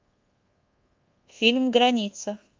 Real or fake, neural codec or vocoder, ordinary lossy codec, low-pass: fake; codec, 24 kHz, 1.2 kbps, DualCodec; Opus, 24 kbps; 7.2 kHz